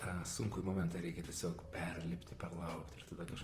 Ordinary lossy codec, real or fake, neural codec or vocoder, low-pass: Opus, 32 kbps; fake; vocoder, 44.1 kHz, 128 mel bands, Pupu-Vocoder; 14.4 kHz